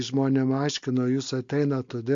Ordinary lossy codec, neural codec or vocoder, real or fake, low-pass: MP3, 48 kbps; none; real; 7.2 kHz